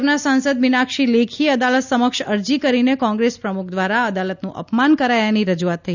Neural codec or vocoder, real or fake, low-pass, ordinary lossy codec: none; real; 7.2 kHz; none